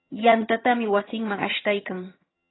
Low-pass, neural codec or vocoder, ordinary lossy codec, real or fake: 7.2 kHz; vocoder, 22.05 kHz, 80 mel bands, HiFi-GAN; AAC, 16 kbps; fake